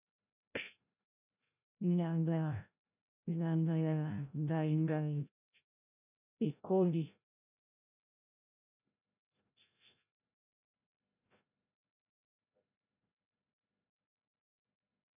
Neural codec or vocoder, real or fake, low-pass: codec, 16 kHz, 0.5 kbps, FreqCodec, larger model; fake; 3.6 kHz